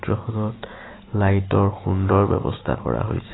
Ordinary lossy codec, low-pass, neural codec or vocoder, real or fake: AAC, 16 kbps; 7.2 kHz; none; real